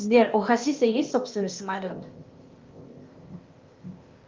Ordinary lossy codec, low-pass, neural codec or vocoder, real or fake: Opus, 32 kbps; 7.2 kHz; codec, 16 kHz, 0.8 kbps, ZipCodec; fake